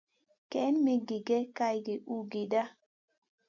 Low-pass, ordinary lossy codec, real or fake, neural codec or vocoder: 7.2 kHz; MP3, 64 kbps; real; none